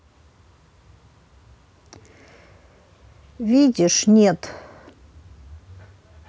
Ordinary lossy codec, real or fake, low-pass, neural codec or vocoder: none; real; none; none